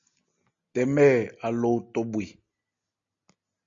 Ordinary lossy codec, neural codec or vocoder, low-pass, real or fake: AAC, 64 kbps; none; 7.2 kHz; real